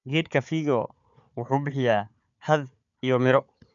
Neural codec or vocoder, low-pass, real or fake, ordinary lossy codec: codec, 16 kHz, 4 kbps, FunCodec, trained on Chinese and English, 50 frames a second; 7.2 kHz; fake; none